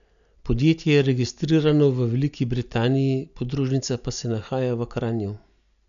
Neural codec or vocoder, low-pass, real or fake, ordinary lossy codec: none; 7.2 kHz; real; none